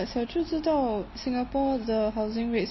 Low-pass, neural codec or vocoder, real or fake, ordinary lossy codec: 7.2 kHz; none; real; MP3, 24 kbps